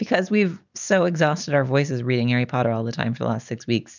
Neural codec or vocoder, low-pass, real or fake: none; 7.2 kHz; real